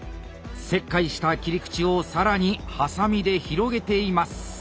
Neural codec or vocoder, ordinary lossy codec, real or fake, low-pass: none; none; real; none